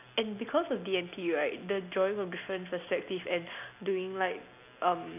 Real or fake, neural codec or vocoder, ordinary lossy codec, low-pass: real; none; none; 3.6 kHz